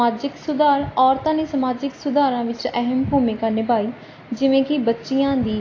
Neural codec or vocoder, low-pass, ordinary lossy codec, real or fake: none; 7.2 kHz; AAC, 32 kbps; real